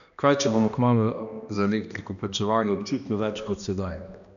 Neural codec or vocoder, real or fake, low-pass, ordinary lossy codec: codec, 16 kHz, 1 kbps, X-Codec, HuBERT features, trained on balanced general audio; fake; 7.2 kHz; none